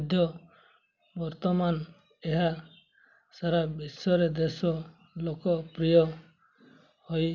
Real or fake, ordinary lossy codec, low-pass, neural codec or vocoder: real; none; 7.2 kHz; none